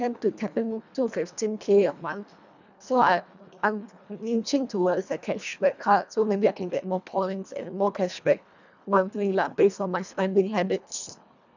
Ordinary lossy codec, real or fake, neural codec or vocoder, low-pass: none; fake; codec, 24 kHz, 1.5 kbps, HILCodec; 7.2 kHz